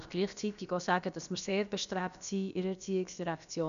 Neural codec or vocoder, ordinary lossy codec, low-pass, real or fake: codec, 16 kHz, about 1 kbps, DyCAST, with the encoder's durations; none; 7.2 kHz; fake